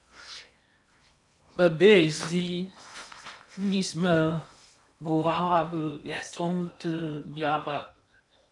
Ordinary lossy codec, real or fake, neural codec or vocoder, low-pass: MP3, 96 kbps; fake; codec, 16 kHz in and 24 kHz out, 0.8 kbps, FocalCodec, streaming, 65536 codes; 10.8 kHz